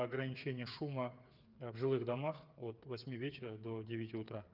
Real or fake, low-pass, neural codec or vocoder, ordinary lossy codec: fake; 5.4 kHz; codec, 16 kHz, 8 kbps, FreqCodec, smaller model; Opus, 24 kbps